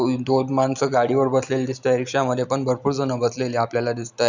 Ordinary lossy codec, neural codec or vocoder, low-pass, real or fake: none; none; 7.2 kHz; real